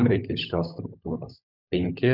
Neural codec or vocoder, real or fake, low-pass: codec, 16 kHz, 16 kbps, FunCodec, trained on LibriTTS, 50 frames a second; fake; 5.4 kHz